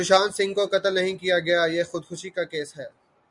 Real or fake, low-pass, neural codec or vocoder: real; 10.8 kHz; none